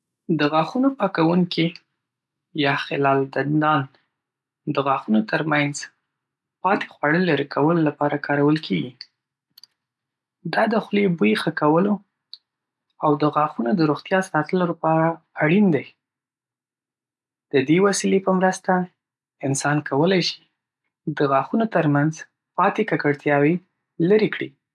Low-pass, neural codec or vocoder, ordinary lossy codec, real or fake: none; none; none; real